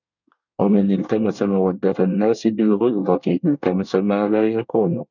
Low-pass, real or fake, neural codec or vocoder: 7.2 kHz; fake; codec, 24 kHz, 1 kbps, SNAC